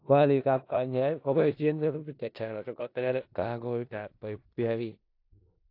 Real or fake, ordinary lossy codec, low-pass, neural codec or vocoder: fake; AAC, 32 kbps; 5.4 kHz; codec, 16 kHz in and 24 kHz out, 0.4 kbps, LongCat-Audio-Codec, four codebook decoder